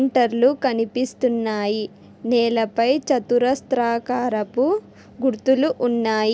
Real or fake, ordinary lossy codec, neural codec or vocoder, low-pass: real; none; none; none